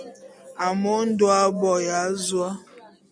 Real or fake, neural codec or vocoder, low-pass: real; none; 9.9 kHz